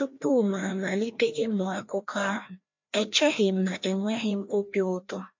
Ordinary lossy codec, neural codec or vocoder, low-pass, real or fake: MP3, 48 kbps; codec, 16 kHz, 1 kbps, FreqCodec, larger model; 7.2 kHz; fake